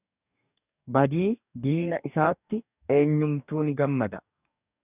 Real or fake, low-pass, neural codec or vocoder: fake; 3.6 kHz; codec, 44.1 kHz, 2.6 kbps, DAC